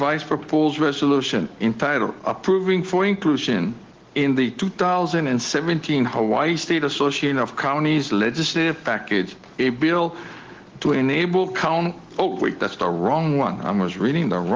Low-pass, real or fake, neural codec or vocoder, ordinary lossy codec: 7.2 kHz; real; none; Opus, 16 kbps